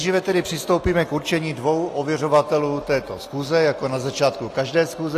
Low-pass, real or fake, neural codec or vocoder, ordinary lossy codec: 14.4 kHz; real; none; AAC, 48 kbps